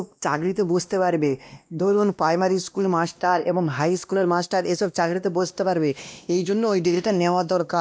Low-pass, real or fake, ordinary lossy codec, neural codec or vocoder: none; fake; none; codec, 16 kHz, 1 kbps, X-Codec, WavLM features, trained on Multilingual LibriSpeech